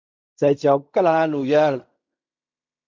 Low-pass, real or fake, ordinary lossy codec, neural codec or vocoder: 7.2 kHz; fake; MP3, 64 kbps; codec, 16 kHz in and 24 kHz out, 0.4 kbps, LongCat-Audio-Codec, fine tuned four codebook decoder